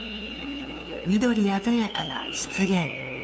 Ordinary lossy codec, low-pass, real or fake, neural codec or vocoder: none; none; fake; codec, 16 kHz, 2 kbps, FunCodec, trained on LibriTTS, 25 frames a second